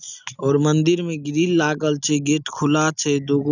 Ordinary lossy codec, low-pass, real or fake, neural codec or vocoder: none; 7.2 kHz; real; none